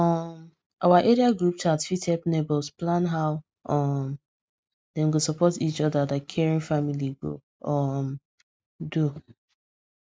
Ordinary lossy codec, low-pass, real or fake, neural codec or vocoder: none; none; real; none